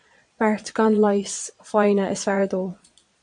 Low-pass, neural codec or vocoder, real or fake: 9.9 kHz; vocoder, 22.05 kHz, 80 mel bands, Vocos; fake